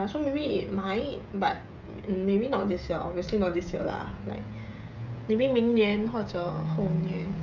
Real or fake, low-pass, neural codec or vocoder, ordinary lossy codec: fake; 7.2 kHz; codec, 16 kHz, 16 kbps, FreqCodec, smaller model; none